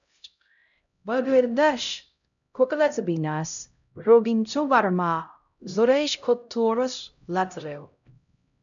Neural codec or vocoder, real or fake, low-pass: codec, 16 kHz, 0.5 kbps, X-Codec, HuBERT features, trained on LibriSpeech; fake; 7.2 kHz